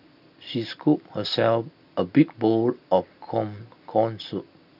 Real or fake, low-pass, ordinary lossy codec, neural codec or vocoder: real; 5.4 kHz; none; none